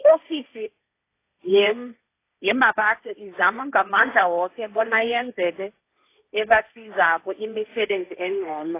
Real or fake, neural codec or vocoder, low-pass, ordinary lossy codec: fake; codec, 16 kHz, 1.1 kbps, Voila-Tokenizer; 3.6 kHz; AAC, 24 kbps